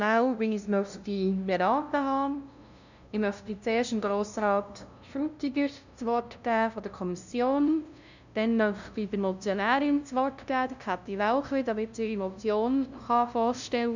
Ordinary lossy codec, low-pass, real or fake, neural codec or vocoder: none; 7.2 kHz; fake; codec, 16 kHz, 0.5 kbps, FunCodec, trained on LibriTTS, 25 frames a second